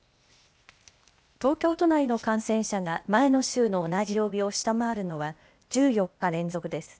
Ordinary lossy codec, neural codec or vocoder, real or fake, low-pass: none; codec, 16 kHz, 0.8 kbps, ZipCodec; fake; none